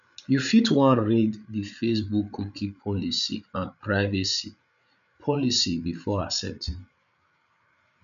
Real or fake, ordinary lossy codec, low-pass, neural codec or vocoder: fake; none; 7.2 kHz; codec, 16 kHz, 8 kbps, FreqCodec, larger model